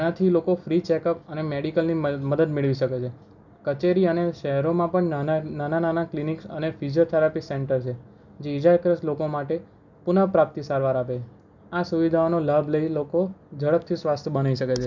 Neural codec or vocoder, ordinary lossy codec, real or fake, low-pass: none; none; real; 7.2 kHz